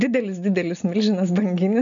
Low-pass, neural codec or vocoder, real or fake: 7.2 kHz; none; real